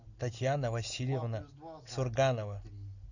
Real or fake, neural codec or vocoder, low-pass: real; none; 7.2 kHz